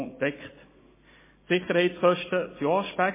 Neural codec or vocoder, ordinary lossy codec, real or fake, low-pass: none; MP3, 16 kbps; real; 3.6 kHz